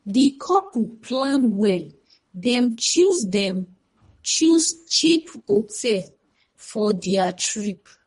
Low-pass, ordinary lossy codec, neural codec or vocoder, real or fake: 10.8 kHz; MP3, 48 kbps; codec, 24 kHz, 1.5 kbps, HILCodec; fake